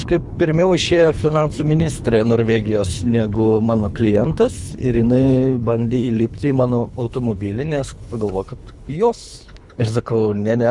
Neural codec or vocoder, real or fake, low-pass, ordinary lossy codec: codec, 24 kHz, 3 kbps, HILCodec; fake; 10.8 kHz; Opus, 64 kbps